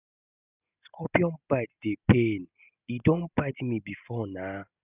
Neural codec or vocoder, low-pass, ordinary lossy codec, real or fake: none; 3.6 kHz; none; real